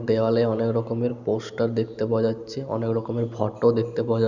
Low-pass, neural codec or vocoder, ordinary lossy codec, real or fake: 7.2 kHz; none; none; real